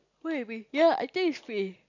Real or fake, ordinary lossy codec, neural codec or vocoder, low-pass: real; none; none; 7.2 kHz